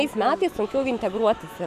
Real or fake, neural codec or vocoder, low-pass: fake; autoencoder, 48 kHz, 128 numbers a frame, DAC-VAE, trained on Japanese speech; 14.4 kHz